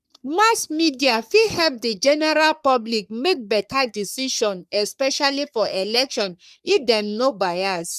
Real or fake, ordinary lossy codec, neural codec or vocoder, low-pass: fake; none; codec, 44.1 kHz, 3.4 kbps, Pupu-Codec; 14.4 kHz